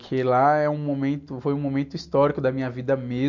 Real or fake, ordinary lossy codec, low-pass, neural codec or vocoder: real; none; 7.2 kHz; none